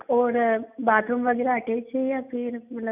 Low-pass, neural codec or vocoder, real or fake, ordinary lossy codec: 3.6 kHz; none; real; none